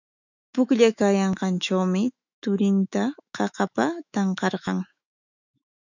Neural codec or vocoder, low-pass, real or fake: autoencoder, 48 kHz, 128 numbers a frame, DAC-VAE, trained on Japanese speech; 7.2 kHz; fake